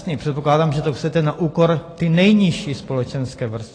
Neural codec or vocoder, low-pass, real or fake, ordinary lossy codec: none; 9.9 kHz; real; AAC, 32 kbps